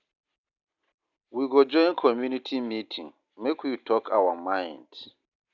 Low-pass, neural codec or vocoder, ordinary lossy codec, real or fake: 7.2 kHz; none; none; real